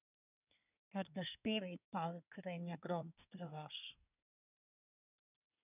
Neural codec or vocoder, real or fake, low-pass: codec, 24 kHz, 1 kbps, SNAC; fake; 3.6 kHz